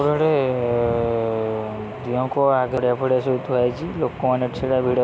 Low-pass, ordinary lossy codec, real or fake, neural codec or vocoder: none; none; real; none